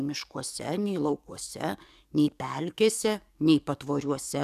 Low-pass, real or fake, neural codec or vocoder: 14.4 kHz; fake; codec, 44.1 kHz, 7.8 kbps, DAC